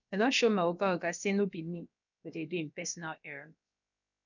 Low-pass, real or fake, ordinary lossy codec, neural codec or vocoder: 7.2 kHz; fake; none; codec, 16 kHz, about 1 kbps, DyCAST, with the encoder's durations